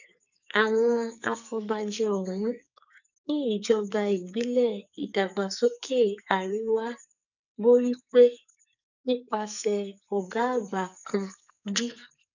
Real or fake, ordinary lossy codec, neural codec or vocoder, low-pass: fake; none; codec, 44.1 kHz, 2.6 kbps, SNAC; 7.2 kHz